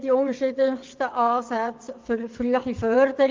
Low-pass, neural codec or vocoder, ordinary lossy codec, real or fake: 7.2 kHz; vocoder, 22.05 kHz, 80 mel bands, WaveNeXt; Opus, 32 kbps; fake